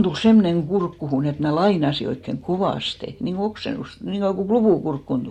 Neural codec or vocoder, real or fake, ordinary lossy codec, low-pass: none; real; MP3, 64 kbps; 19.8 kHz